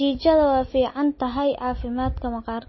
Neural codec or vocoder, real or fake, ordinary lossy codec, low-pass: none; real; MP3, 24 kbps; 7.2 kHz